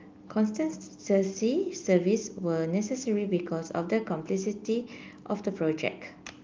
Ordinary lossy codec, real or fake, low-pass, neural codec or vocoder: Opus, 24 kbps; real; 7.2 kHz; none